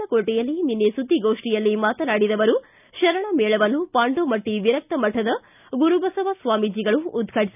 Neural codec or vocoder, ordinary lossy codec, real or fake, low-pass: none; none; real; 3.6 kHz